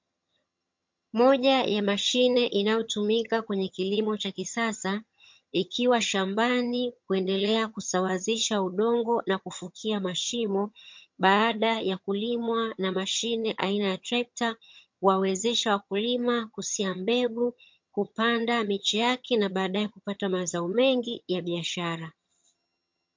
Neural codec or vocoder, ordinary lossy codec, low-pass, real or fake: vocoder, 22.05 kHz, 80 mel bands, HiFi-GAN; MP3, 48 kbps; 7.2 kHz; fake